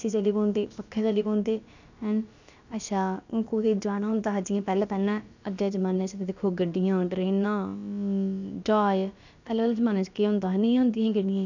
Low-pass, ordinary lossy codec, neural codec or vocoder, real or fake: 7.2 kHz; none; codec, 16 kHz, about 1 kbps, DyCAST, with the encoder's durations; fake